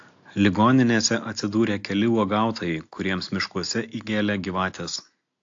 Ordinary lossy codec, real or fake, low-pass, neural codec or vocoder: AAC, 48 kbps; real; 7.2 kHz; none